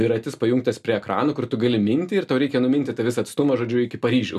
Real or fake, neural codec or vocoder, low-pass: real; none; 14.4 kHz